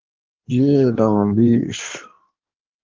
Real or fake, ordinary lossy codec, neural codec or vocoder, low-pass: fake; Opus, 24 kbps; codec, 16 kHz in and 24 kHz out, 1.1 kbps, FireRedTTS-2 codec; 7.2 kHz